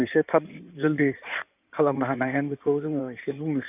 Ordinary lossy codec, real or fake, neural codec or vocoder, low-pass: none; fake; codec, 16 kHz in and 24 kHz out, 2.2 kbps, FireRedTTS-2 codec; 3.6 kHz